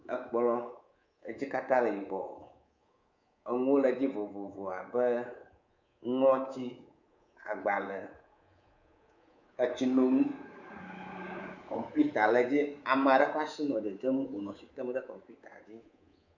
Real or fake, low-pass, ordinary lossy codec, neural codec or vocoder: fake; 7.2 kHz; Opus, 64 kbps; codec, 24 kHz, 3.1 kbps, DualCodec